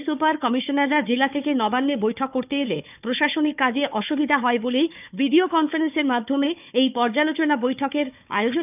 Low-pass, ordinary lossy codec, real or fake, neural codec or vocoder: 3.6 kHz; none; fake; codec, 16 kHz, 4 kbps, FunCodec, trained on Chinese and English, 50 frames a second